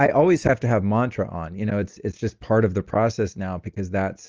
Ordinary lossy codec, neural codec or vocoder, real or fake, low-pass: Opus, 24 kbps; none; real; 7.2 kHz